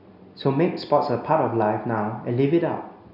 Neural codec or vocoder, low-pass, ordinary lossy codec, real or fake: none; 5.4 kHz; none; real